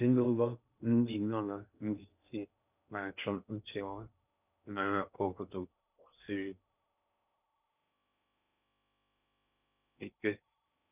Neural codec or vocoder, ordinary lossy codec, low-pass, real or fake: codec, 16 kHz in and 24 kHz out, 0.6 kbps, FocalCodec, streaming, 2048 codes; none; 3.6 kHz; fake